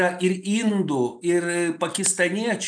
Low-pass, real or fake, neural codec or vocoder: 9.9 kHz; real; none